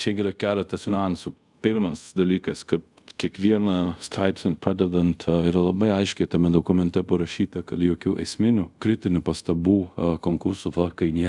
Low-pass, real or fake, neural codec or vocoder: 10.8 kHz; fake; codec, 24 kHz, 0.5 kbps, DualCodec